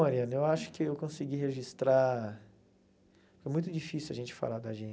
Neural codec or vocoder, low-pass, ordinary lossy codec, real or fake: none; none; none; real